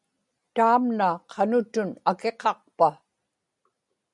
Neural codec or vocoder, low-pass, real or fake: none; 10.8 kHz; real